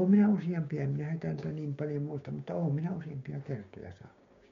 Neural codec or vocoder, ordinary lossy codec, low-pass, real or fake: codec, 16 kHz, 6 kbps, DAC; MP3, 48 kbps; 7.2 kHz; fake